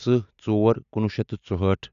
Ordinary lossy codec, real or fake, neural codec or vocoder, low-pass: none; real; none; 7.2 kHz